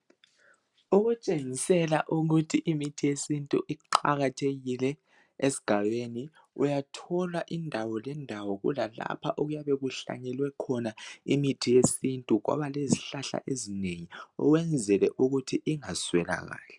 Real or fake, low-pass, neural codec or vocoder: real; 10.8 kHz; none